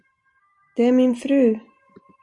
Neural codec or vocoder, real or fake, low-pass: none; real; 10.8 kHz